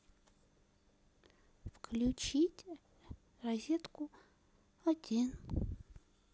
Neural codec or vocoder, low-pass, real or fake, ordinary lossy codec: none; none; real; none